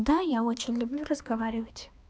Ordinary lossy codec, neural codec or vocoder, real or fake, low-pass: none; codec, 16 kHz, 2 kbps, X-Codec, HuBERT features, trained on balanced general audio; fake; none